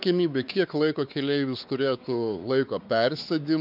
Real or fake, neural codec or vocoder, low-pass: fake; codec, 16 kHz, 4 kbps, X-Codec, WavLM features, trained on Multilingual LibriSpeech; 5.4 kHz